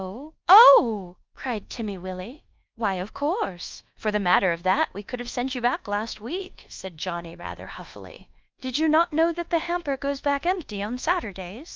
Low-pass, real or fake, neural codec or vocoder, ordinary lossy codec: 7.2 kHz; fake; codec, 24 kHz, 1.2 kbps, DualCodec; Opus, 24 kbps